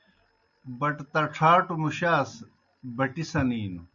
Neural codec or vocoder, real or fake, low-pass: none; real; 7.2 kHz